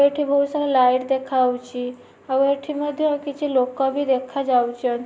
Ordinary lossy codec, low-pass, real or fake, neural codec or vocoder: none; none; real; none